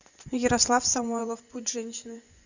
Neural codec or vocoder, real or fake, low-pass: vocoder, 24 kHz, 100 mel bands, Vocos; fake; 7.2 kHz